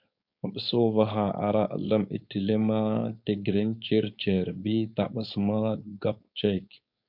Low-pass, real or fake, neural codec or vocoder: 5.4 kHz; fake; codec, 16 kHz, 4.8 kbps, FACodec